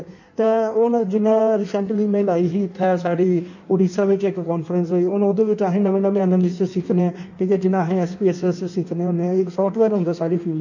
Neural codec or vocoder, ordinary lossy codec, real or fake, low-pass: codec, 16 kHz in and 24 kHz out, 1.1 kbps, FireRedTTS-2 codec; none; fake; 7.2 kHz